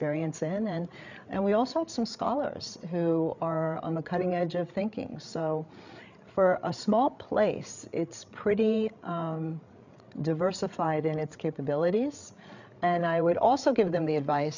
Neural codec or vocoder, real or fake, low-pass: codec, 16 kHz, 8 kbps, FreqCodec, larger model; fake; 7.2 kHz